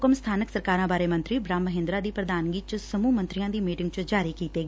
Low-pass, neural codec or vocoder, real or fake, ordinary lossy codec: none; none; real; none